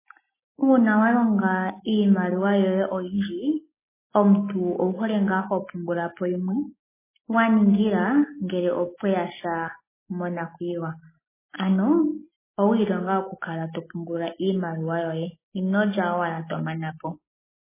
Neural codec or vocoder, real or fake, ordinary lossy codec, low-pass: none; real; MP3, 16 kbps; 3.6 kHz